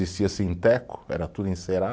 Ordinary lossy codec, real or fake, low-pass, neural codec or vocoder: none; real; none; none